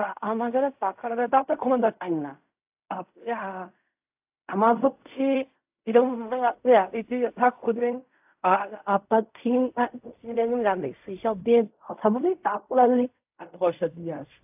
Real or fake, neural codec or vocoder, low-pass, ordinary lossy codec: fake; codec, 16 kHz in and 24 kHz out, 0.4 kbps, LongCat-Audio-Codec, fine tuned four codebook decoder; 3.6 kHz; AAC, 32 kbps